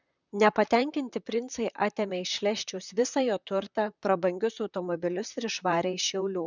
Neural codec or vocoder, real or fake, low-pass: vocoder, 44.1 kHz, 128 mel bands, Pupu-Vocoder; fake; 7.2 kHz